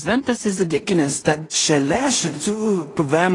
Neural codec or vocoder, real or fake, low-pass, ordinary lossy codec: codec, 16 kHz in and 24 kHz out, 0.4 kbps, LongCat-Audio-Codec, two codebook decoder; fake; 10.8 kHz; AAC, 32 kbps